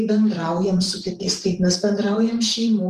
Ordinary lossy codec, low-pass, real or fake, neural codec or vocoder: Opus, 32 kbps; 14.4 kHz; fake; vocoder, 44.1 kHz, 128 mel bands every 512 samples, BigVGAN v2